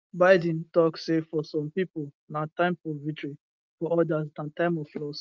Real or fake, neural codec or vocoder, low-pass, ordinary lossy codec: real; none; 7.2 kHz; Opus, 32 kbps